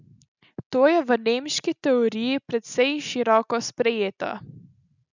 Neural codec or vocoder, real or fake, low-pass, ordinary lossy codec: none; real; 7.2 kHz; none